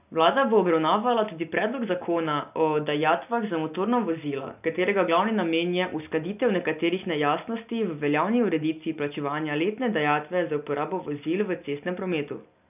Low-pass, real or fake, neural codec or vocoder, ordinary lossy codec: 3.6 kHz; real; none; none